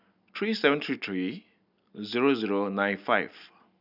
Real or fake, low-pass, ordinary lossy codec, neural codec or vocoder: real; 5.4 kHz; none; none